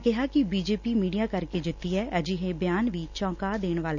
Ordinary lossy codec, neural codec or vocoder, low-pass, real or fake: none; none; 7.2 kHz; real